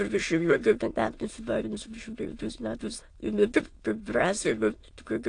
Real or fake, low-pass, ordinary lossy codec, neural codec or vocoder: fake; 9.9 kHz; AAC, 48 kbps; autoencoder, 22.05 kHz, a latent of 192 numbers a frame, VITS, trained on many speakers